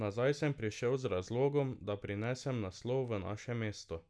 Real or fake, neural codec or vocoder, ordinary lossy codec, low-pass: real; none; none; none